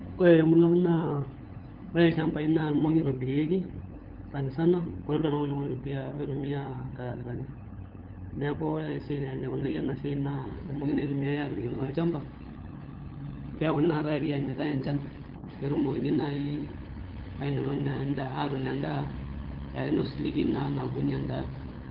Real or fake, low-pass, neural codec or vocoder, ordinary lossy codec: fake; 5.4 kHz; codec, 16 kHz, 8 kbps, FunCodec, trained on LibriTTS, 25 frames a second; Opus, 16 kbps